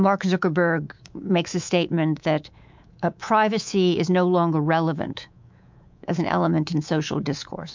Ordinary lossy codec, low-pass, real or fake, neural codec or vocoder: MP3, 64 kbps; 7.2 kHz; fake; codec, 24 kHz, 3.1 kbps, DualCodec